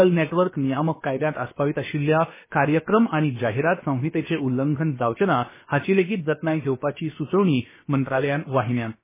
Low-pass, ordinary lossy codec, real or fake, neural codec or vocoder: 3.6 kHz; MP3, 16 kbps; fake; codec, 16 kHz, about 1 kbps, DyCAST, with the encoder's durations